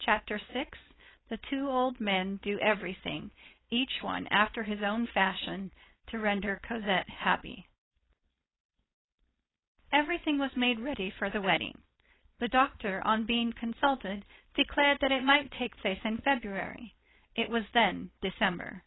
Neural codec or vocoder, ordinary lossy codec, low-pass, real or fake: codec, 16 kHz, 4.8 kbps, FACodec; AAC, 16 kbps; 7.2 kHz; fake